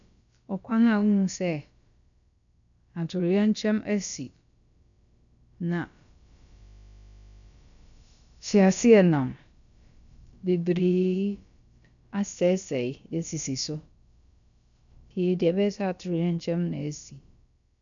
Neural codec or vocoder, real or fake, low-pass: codec, 16 kHz, about 1 kbps, DyCAST, with the encoder's durations; fake; 7.2 kHz